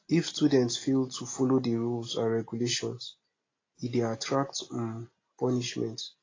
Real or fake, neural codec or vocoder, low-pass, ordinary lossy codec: real; none; 7.2 kHz; AAC, 32 kbps